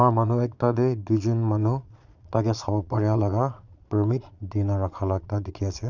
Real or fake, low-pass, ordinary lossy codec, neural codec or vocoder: fake; 7.2 kHz; none; vocoder, 22.05 kHz, 80 mel bands, Vocos